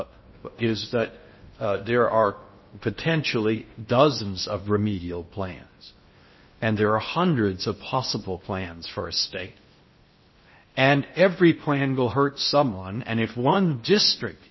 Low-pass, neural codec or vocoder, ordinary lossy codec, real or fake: 7.2 kHz; codec, 16 kHz in and 24 kHz out, 0.6 kbps, FocalCodec, streaming, 4096 codes; MP3, 24 kbps; fake